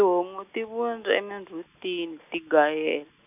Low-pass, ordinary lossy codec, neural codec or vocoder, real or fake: 3.6 kHz; none; none; real